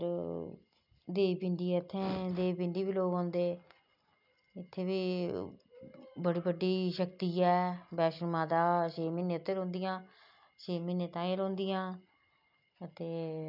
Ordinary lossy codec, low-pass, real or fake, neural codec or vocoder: none; 5.4 kHz; real; none